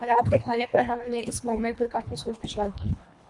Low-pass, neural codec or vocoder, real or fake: 10.8 kHz; codec, 24 kHz, 1.5 kbps, HILCodec; fake